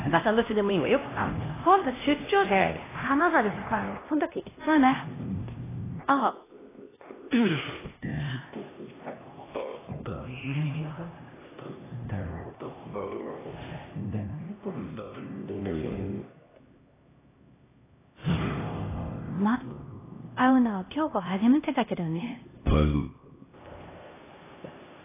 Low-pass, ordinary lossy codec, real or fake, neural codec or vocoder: 3.6 kHz; AAC, 16 kbps; fake; codec, 16 kHz, 1 kbps, X-Codec, HuBERT features, trained on LibriSpeech